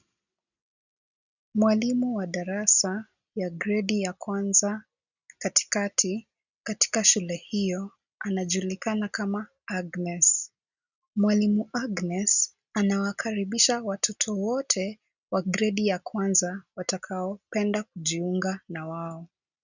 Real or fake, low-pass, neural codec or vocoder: real; 7.2 kHz; none